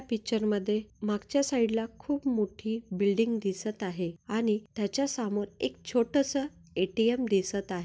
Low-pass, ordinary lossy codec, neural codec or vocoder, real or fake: none; none; none; real